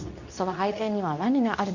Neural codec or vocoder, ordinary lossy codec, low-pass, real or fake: codec, 24 kHz, 0.9 kbps, WavTokenizer, medium speech release version 2; none; 7.2 kHz; fake